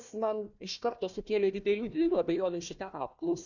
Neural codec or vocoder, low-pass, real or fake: codec, 16 kHz, 1 kbps, FunCodec, trained on Chinese and English, 50 frames a second; 7.2 kHz; fake